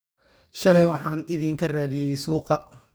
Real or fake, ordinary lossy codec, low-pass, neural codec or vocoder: fake; none; none; codec, 44.1 kHz, 2.6 kbps, DAC